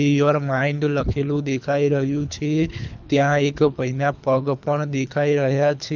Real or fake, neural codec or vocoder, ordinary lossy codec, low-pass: fake; codec, 24 kHz, 3 kbps, HILCodec; none; 7.2 kHz